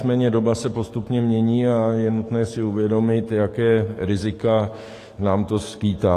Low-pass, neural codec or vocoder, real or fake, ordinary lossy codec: 14.4 kHz; codec, 44.1 kHz, 7.8 kbps, DAC; fake; AAC, 48 kbps